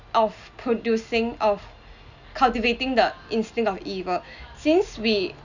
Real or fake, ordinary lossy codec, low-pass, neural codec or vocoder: real; none; 7.2 kHz; none